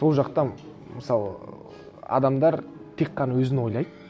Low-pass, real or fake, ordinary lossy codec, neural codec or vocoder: none; real; none; none